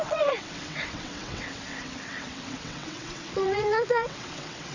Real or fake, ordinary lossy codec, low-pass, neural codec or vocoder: fake; none; 7.2 kHz; vocoder, 22.05 kHz, 80 mel bands, WaveNeXt